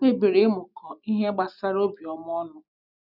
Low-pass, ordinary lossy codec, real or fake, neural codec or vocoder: 5.4 kHz; none; real; none